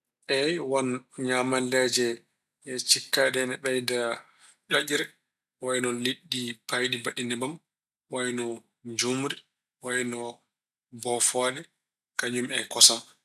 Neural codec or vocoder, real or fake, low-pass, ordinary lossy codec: none; real; 14.4 kHz; none